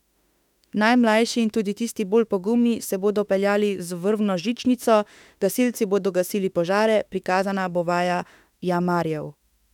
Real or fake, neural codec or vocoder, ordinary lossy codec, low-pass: fake; autoencoder, 48 kHz, 32 numbers a frame, DAC-VAE, trained on Japanese speech; none; 19.8 kHz